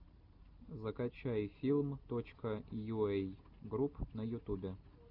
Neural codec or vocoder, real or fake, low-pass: none; real; 5.4 kHz